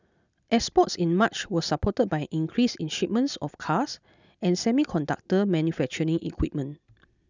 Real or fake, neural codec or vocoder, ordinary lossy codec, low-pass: real; none; none; 7.2 kHz